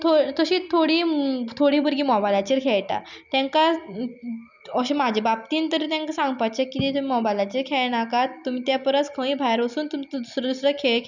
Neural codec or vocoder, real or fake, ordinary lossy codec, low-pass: none; real; none; 7.2 kHz